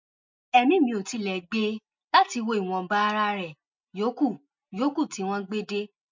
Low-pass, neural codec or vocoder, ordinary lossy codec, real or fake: 7.2 kHz; none; MP3, 64 kbps; real